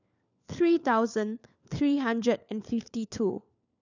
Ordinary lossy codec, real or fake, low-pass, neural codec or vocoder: none; fake; 7.2 kHz; codec, 16 kHz, 4 kbps, FunCodec, trained on LibriTTS, 50 frames a second